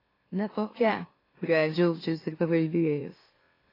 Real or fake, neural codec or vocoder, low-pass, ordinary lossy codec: fake; autoencoder, 44.1 kHz, a latent of 192 numbers a frame, MeloTTS; 5.4 kHz; AAC, 24 kbps